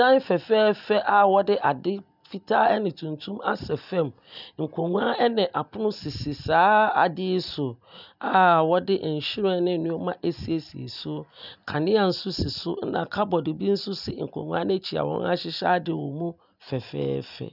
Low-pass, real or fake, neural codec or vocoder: 5.4 kHz; real; none